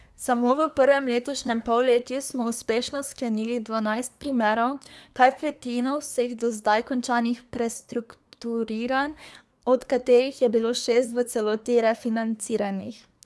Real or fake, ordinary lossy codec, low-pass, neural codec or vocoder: fake; none; none; codec, 24 kHz, 1 kbps, SNAC